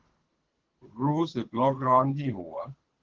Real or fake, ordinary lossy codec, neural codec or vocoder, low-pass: fake; Opus, 16 kbps; codec, 24 kHz, 3 kbps, HILCodec; 7.2 kHz